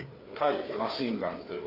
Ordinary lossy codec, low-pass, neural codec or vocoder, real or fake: AAC, 32 kbps; 5.4 kHz; codec, 16 kHz, 4 kbps, FreqCodec, larger model; fake